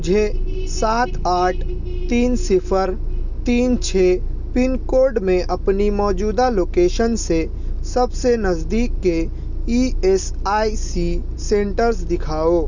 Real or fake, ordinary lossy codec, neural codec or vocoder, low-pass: real; none; none; 7.2 kHz